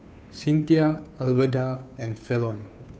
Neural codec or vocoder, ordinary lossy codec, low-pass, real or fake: codec, 16 kHz, 2 kbps, FunCodec, trained on Chinese and English, 25 frames a second; none; none; fake